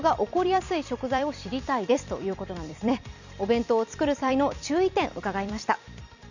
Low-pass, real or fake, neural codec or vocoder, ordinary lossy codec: 7.2 kHz; fake; vocoder, 44.1 kHz, 128 mel bands every 256 samples, BigVGAN v2; none